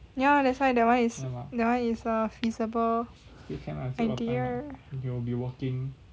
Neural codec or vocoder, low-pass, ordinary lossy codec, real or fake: none; none; none; real